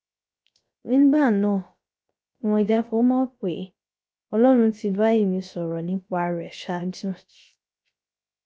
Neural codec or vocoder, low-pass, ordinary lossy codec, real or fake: codec, 16 kHz, 0.3 kbps, FocalCodec; none; none; fake